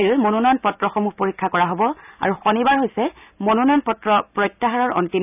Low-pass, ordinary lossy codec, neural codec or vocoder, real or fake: 3.6 kHz; none; none; real